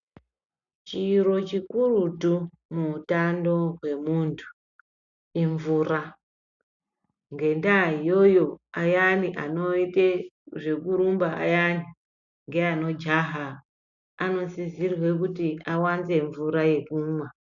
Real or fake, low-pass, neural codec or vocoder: real; 7.2 kHz; none